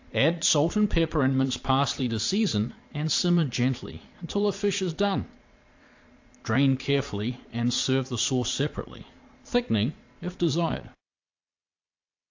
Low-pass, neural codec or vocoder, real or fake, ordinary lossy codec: 7.2 kHz; vocoder, 22.05 kHz, 80 mel bands, Vocos; fake; AAC, 48 kbps